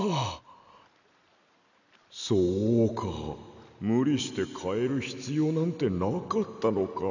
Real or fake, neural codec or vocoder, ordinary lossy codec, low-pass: real; none; none; 7.2 kHz